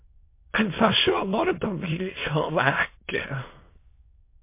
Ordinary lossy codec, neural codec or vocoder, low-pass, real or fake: MP3, 24 kbps; autoencoder, 22.05 kHz, a latent of 192 numbers a frame, VITS, trained on many speakers; 3.6 kHz; fake